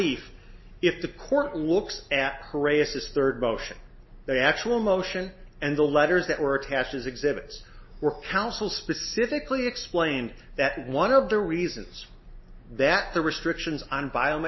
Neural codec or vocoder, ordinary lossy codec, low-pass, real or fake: none; MP3, 24 kbps; 7.2 kHz; real